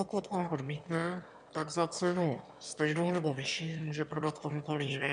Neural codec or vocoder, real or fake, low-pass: autoencoder, 22.05 kHz, a latent of 192 numbers a frame, VITS, trained on one speaker; fake; 9.9 kHz